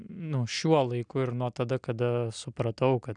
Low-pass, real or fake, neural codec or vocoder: 9.9 kHz; real; none